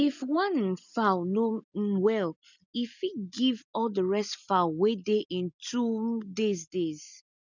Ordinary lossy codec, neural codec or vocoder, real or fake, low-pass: none; none; real; 7.2 kHz